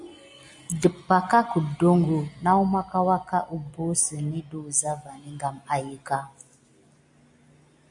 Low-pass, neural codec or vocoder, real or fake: 10.8 kHz; none; real